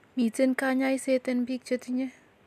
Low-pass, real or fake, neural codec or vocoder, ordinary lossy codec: 14.4 kHz; real; none; none